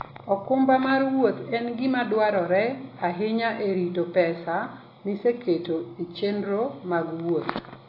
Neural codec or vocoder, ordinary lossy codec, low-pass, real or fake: none; AAC, 32 kbps; 5.4 kHz; real